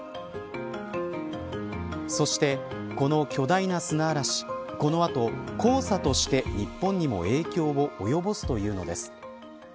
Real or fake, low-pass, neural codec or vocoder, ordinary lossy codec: real; none; none; none